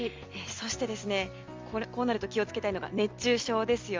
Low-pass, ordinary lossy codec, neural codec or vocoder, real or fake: 7.2 kHz; Opus, 32 kbps; none; real